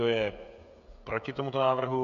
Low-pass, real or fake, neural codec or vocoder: 7.2 kHz; fake; codec, 16 kHz, 16 kbps, FreqCodec, smaller model